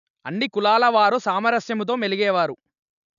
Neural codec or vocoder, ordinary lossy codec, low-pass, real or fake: none; none; 7.2 kHz; real